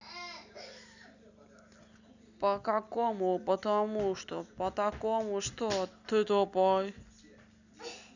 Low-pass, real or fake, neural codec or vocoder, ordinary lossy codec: 7.2 kHz; real; none; none